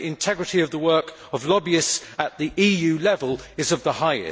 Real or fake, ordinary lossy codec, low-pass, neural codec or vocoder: real; none; none; none